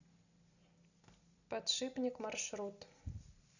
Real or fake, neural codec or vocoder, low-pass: real; none; 7.2 kHz